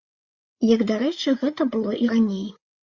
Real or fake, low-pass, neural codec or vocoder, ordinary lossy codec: fake; 7.2 kHz; codec, 16 kHz, 8 kbps, FreqCodec, larger model; Opus, 64 kbps